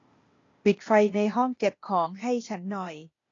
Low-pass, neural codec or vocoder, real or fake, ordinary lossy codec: 7.2 kHz; codec, 16 kHz, 0.8 kbps, ZipCodec; fake; AAC, 32 kbps